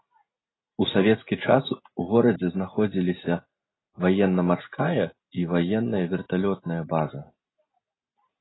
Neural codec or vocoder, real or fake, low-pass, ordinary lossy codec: none; real; 7.2 kHz; AAC, 16 kbps